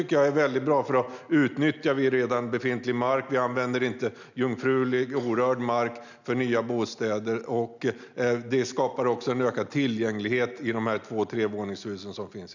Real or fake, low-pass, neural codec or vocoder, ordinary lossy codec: real; 7.2 kHz; none; none